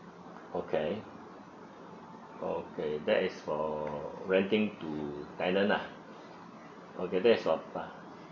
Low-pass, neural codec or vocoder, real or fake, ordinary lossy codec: 7.2 kHz; none; real; none